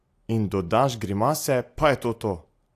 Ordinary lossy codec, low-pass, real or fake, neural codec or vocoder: AAC, 64 kbps; 14.4 kHz; real; none